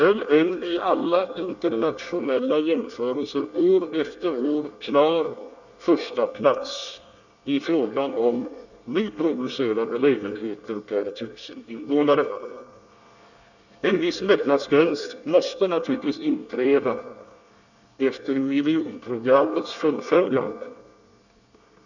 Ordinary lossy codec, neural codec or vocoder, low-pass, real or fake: none; codec, 24 kHz, 1 kbps, SNAC; 7.2 kHz; fake